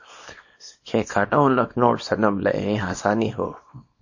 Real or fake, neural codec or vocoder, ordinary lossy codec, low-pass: fake; codec, 24 kHz, 0.9 kbps, WavTokenizer, small release; MP3, 32 kbps; 7.2 kHz